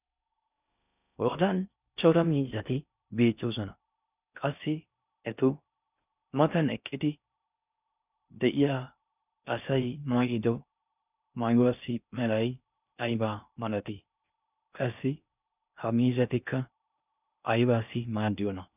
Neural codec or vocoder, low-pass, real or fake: codec, 16 kHz in and 24 kHz out, 0.6 kbps, FocalCodec, streaming, 4096 codes; 3.6 kHz; fake